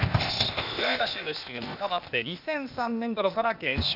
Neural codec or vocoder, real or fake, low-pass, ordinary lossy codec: codec, 16 kHz, 0.8 kbps, ZipCodec; fake; 5.4 kHz; none